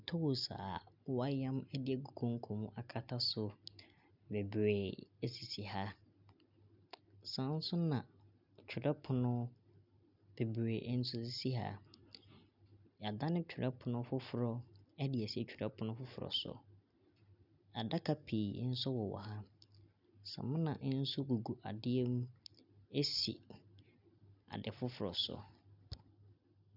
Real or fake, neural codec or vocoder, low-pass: real; none; 5.4 kHz